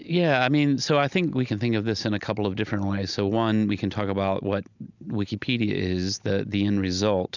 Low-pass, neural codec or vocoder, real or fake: 7.2 kHz; none; real